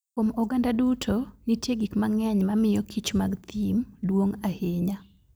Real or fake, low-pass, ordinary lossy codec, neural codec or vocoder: fake; none; none; vocoder, 44.1 kHz, 128 mel bands every 256 samples, BigVGAN v2